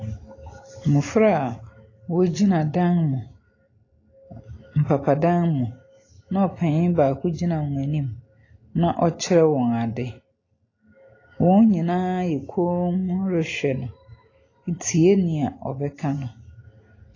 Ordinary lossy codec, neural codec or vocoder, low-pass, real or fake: AAC, 32 kbps; none; 7.2 kHz; real